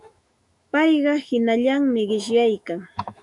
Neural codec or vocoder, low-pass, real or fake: autoencoder, 48 kHz, 128 numbers a frame, DAC-VAE, trained on Japanese speech; 10.8 kHz; fake